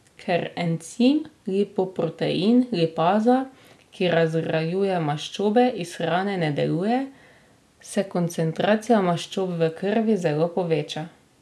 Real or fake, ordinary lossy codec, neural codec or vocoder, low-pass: real; none; none; none